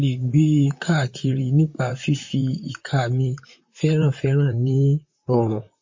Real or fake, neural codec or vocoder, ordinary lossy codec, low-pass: fake; vocoder, 44.1 kHz, 128 mel bands, Pupu-Vocoder; MP3, 32 kbps; 7.2 kHz